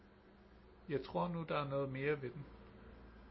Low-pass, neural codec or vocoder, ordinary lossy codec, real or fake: 7.2 kHz; none; MP3, 24 kbps; real